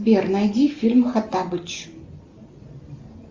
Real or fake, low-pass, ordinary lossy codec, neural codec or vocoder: real; 7.2 kHz; Opus, 32 kbps; none